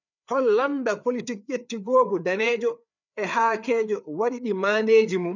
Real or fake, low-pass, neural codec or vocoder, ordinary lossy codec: fake; 7.2 kHz; codec, 16 kHz, 4 kbps, FreqCodec, larger model; none